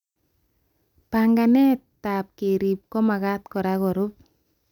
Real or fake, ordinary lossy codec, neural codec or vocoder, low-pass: real; none; none; 19.8 kHz